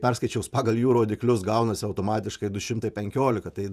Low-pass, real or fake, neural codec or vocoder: 14.4 kHz; fake; vocoder, 48 kHz, 128 mel bands, Vocos